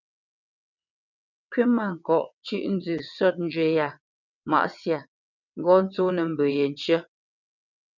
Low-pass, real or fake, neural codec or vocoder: 7.2 kHz; fake; vocoder, 22.05 kHz, 80 mel bands, WaveNeXt